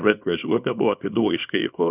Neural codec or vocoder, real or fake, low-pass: codec, 24 kHz, 0.9 kbps, WavTokenizer, small release; fake; 3.6 kHz